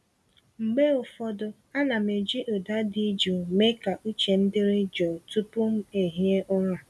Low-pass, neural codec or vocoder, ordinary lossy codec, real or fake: none; none; none; real